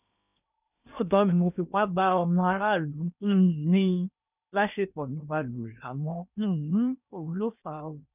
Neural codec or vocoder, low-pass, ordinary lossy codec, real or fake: codec, 16 kHz in and 24 kHz out, 0.8 kbps, FocalCodec, streaming, 65536 codes; 3.6 kHz; none; fake